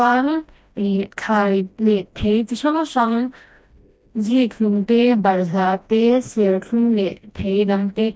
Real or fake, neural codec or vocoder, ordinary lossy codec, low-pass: fake; codec, 16 kHz, 1 kbps, FreqCodec, smaller model; none; none